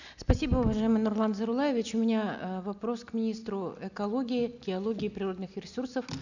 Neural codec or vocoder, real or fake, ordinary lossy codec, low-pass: none; real; none; 7.2 kHz